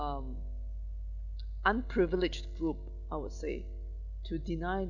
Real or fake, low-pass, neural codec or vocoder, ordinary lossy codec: real; 7.2 kHz; none; none